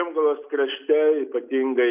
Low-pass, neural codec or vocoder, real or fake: 3.6 kHz; none; real